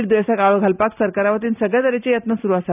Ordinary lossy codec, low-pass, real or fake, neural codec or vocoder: none; 3.6 kHz; real; none